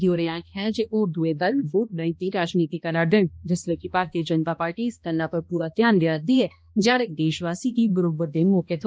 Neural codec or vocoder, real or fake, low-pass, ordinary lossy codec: codec, 16 kHz, 1 kbps, X-Codec, HuBERT features, trained on balanced general audio; fake; none; none